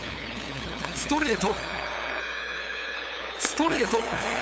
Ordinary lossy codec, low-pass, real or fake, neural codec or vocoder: none; none; fake; codec, 16 kHz, 8 kbps, FunCodec, trained on LibriTTS, 25 frames a second